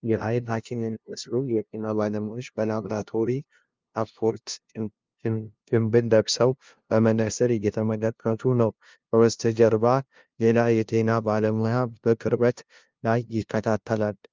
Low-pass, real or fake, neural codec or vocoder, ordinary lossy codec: 7.2 kHz; fake; codec, 16 kHz, 0.5 kbps, FunCodec, trained on LibriTTS, 25 frames a second; Opus, 24 kbps